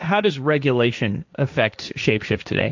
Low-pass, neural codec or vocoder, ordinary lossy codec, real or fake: 7.2 kHz; codec, 16 kHz, 1.1 kbps, Voila-Tokenizer; MP3, 64 kbps; fake